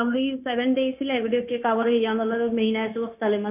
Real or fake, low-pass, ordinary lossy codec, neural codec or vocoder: fake; 3.6 kHz; none; codec, 16 kHz, 0.9 kbps, LongCat-Audio-Codec